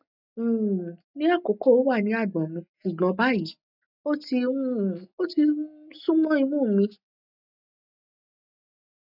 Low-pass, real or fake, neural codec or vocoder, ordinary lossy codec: 5.4 kHz; real; none; none